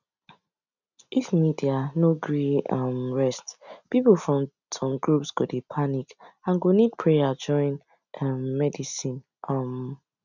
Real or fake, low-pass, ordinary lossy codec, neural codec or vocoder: real; 7.2 kHz; none; none